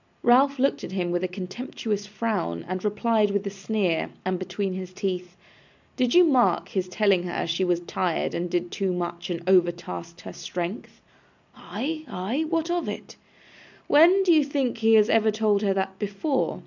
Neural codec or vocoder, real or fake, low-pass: none; real; 7.2 kHz